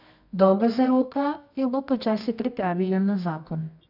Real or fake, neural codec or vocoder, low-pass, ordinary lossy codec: fake; codec, 24 kHz, 0.9 kbps, WavTokenizer, medium music audio release; 5.4 kHz; none